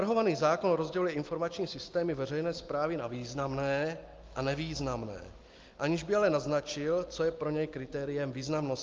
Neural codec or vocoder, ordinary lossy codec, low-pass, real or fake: none; Opus, 32 kbps; 7.2 kHz; real